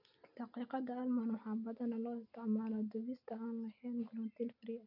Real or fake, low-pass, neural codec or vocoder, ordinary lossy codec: real; 5.4 kHz; none; none